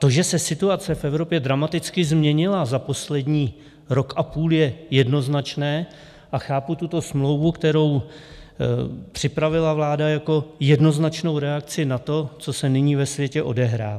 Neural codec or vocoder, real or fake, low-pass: none; real; 14.4 kHz